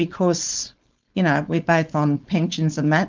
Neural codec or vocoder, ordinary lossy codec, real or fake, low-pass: codec, 16 kHz, 4.8 kbps, FACodec; Opus, 24 kbps; fake; 7.2 kHz